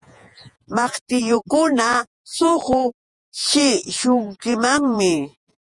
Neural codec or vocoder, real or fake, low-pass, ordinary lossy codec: vocoder, 48 kHz, 128 mel bands, Vocos; fake; 10.8 kHz; Opus, 64 kbps